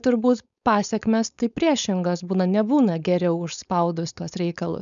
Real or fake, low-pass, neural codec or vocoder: fake; 7.2 kHz; codec, 16 kHz, 4.8 kbps, FACodec